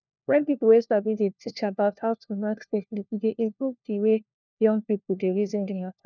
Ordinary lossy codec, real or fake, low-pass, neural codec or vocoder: none; fake; 7.2 kHz; codec, 16 kHz, 1 kbps, FunCodec, trained on LibriTTS, 50 frames a second